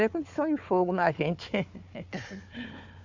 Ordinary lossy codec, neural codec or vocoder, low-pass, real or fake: MP3, 64 kbps; codec, 16 kHz, 4 kbps, FunCodec, trained on Chinese and English, 50 frames a second; 7.2 kHz; fake